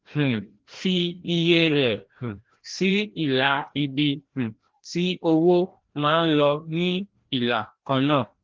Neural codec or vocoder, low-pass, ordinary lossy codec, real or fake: codec, 16 kHz, 1 kbps, FreqCodec, larger model; 7.2 kHz; Opus, 16 kbps; fake